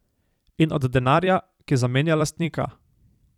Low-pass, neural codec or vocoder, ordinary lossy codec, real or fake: 19.8 kHz; vocoder, 44.1 kHz, 128 mel bands every 256 samples, BigVGAN v2; none; fake